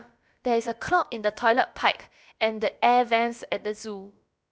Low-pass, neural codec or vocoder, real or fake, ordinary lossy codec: none; codec, 16 kHz, about 1 kbps, DyCAST, with the encoder's durations; fake; none